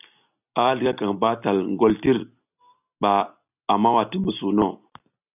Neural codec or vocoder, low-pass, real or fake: none; 3.6 kHz; real